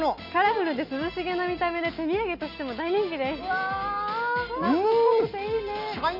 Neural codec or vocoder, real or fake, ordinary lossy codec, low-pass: none; real; none; 5.4 kHz